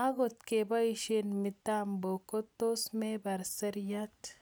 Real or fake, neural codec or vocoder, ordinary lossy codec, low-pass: real; none; none; none